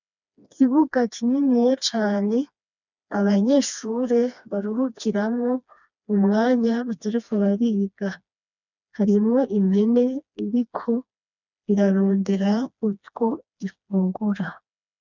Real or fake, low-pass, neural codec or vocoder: fake; 7.2 kHz; codec, 16 kHz, 2 kbps, FreqCodec, smaller model